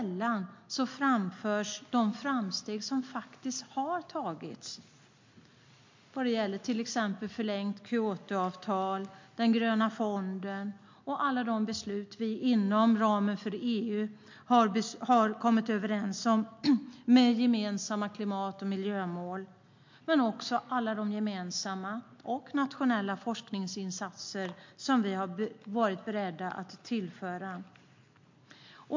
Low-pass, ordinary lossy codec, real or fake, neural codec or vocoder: 7.2 kHz; MP3, 48 kbps; real; none